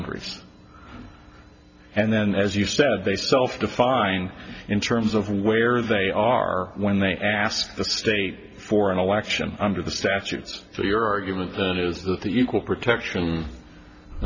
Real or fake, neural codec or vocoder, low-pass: real; none; 7.2 kHz